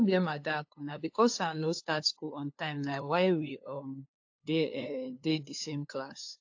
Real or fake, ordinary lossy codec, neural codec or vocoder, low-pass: fake; AAC, 48 kbps; codec, 16 kHz, 2 kbps, FunCodec, trained on LibriTTS, 25 frames a second; 7.2 kHz